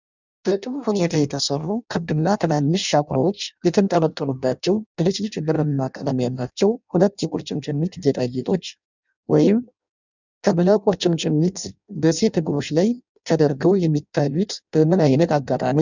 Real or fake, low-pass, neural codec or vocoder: fake; 7.2 kHz; codec, 16 kHz in and 24 kHz out, 0.6 kbps, FireRedTTS-2 codec